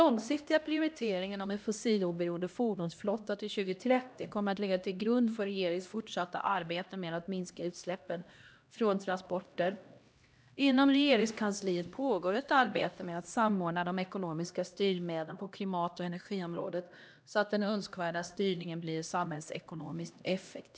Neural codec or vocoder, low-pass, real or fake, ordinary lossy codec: codec, 16 kHz, 1 kbps, X-Codec, HuBERT features, trained on LibriSpeech; none; fake; none